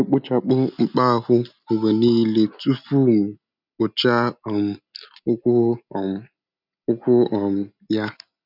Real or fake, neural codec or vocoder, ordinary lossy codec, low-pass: real; none; none; 5.4 kHz